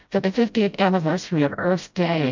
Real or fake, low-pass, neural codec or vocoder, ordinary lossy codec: fake; 7.2 kHz; codec, 16 kHz, 0.5 kbps, FreqCodec, smaller model; MP3, 64 kbps